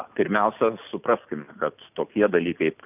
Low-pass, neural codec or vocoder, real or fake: 3.6 kHz; none; real